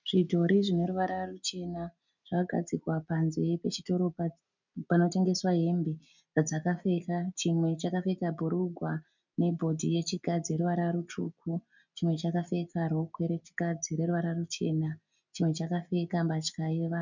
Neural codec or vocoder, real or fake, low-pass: none; real; 7.2 kHz